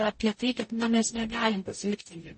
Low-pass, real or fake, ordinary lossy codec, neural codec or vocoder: 10.8 kHz; fake; MP3, 32 kbps; codec, 44.1 kHz, 0.9 kbps, DAC